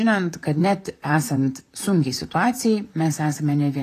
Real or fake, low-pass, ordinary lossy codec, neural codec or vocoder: fake; 14.4 kHz; AAC, 48 kbps; vocoder, 44.1 kHz, 128 mel bands, Pupu-Vocoder